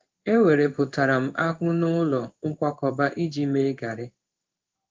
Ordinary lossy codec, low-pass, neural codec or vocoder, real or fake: Opus, 32 kbps; 7.2 kHz; codec, 16 kHz in and 24 kHz out, 1 kbps, XY-Tokenizer; fake